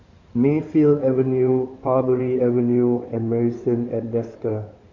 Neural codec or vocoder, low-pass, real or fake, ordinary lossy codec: codec, 16 kHz in and 24 kHz out, 2.2 kbps, FireRedTTS-2 codec; 7.2 kHz; fake; MP3, 64 kbps